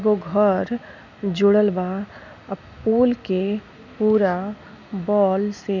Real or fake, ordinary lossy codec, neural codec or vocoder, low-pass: real; none; none; 7.2 kHz